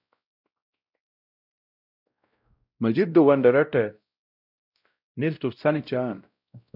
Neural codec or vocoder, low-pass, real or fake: codec, 16 kHz, 0.5 kbps, X-Codec, WavLM features, trained on Multilingual LibriSpeech; 5.4 kHz; fake